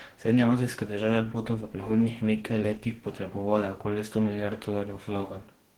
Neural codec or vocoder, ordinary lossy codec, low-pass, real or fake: codec, 44.1 kHz, 2.6 kbps, DAC; Opus, 16 kbps; 19.8 kHz; fake